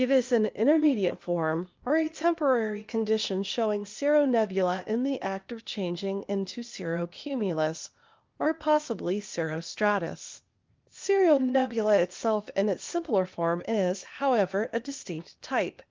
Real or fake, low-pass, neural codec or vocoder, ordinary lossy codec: fake; 7.2 kHz; codec, 16 kHz, 0.8 kbps, ZipCodec; Opus, 24 kbps